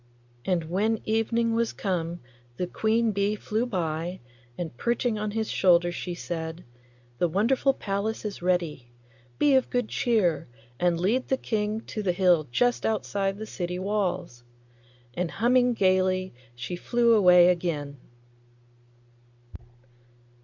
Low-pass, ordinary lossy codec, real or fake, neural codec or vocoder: 7.2 kHz; Opus, 64 kbps; real; none